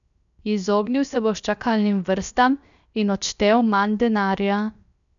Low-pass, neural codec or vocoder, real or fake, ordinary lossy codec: 7.2 kHz; codec, 16 kHz, 0.7 kbps, FocalCodec; fake; none